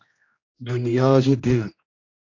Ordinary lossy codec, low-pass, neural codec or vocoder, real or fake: AAC, 48 kbps; 7.2 kHz; codec, 16 kHz, 1 kbps, X-Codec, HuBERT features, trained on general audio; fake